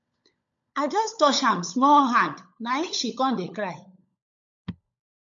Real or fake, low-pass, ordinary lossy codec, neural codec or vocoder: fake; 7.2 kHz; MP3, 64 kbps; codec, 16 kHz, 16 kbps, FunCodec, trained on LibriTTS, 50 frames a second